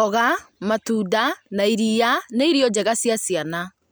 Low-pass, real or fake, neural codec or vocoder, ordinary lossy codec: none; real; none; none